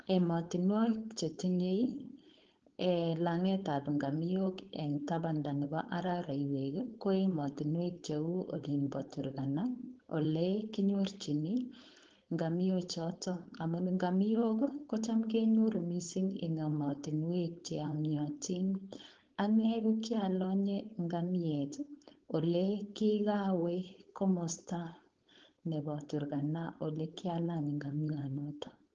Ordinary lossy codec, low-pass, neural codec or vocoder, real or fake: Opus, 16 kbps; 7.2 kHz; codec, 16 kHz, 4.8 kbps, FACodec; fake